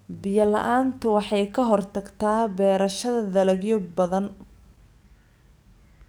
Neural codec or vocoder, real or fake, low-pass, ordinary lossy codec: codec, 44.1 kHz, 7.8 kbps, DAC; fake; none; none